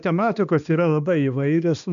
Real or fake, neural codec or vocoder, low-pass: fake; codec, 16 kHz, 2 kbps, X-Codec, HuBERT features, trained on balanced general audio; 7.2 kHz